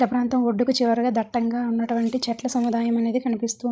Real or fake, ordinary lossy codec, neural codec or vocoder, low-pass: fake; none; codec, 16 kHz, 16 kbps, FreqCodec, larger model; none